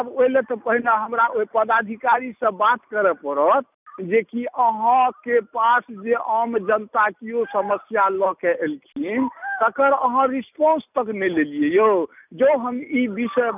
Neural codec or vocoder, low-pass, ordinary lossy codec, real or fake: none; 3.6 kHz; none; real